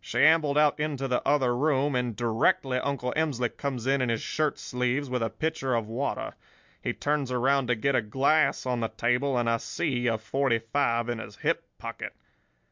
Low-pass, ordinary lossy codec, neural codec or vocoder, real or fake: 7.2 kHz; MP3, 64 kbps; none; real